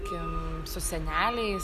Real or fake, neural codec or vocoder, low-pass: real; none; 14.4 kHz